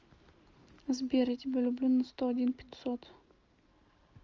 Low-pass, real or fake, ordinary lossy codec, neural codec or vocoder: 7.2 kHz; real; Opus, 24 kbps; none